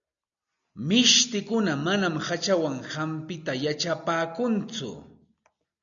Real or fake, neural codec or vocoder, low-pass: real; none; 7.2 kHz